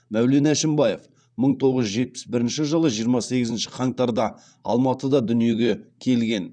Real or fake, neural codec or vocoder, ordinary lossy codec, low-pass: fake; vocoder, 44.1 kHz, 128 mel bands, Pupu-Vocoder; none; 9.9 kHz